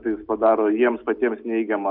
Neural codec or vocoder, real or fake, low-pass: none; real; 5.4 kHz